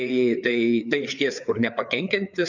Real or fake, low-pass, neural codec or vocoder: fake; 7.2 kHz; codec, 16 kHz, 4 kbps, FreqCodec, larger model